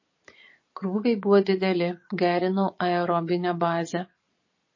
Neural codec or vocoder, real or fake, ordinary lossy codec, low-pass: vocoder, 22.05 kHz, 80 mel bands, Vocos; fake; MP3, 32 kbps; 7.2 kHz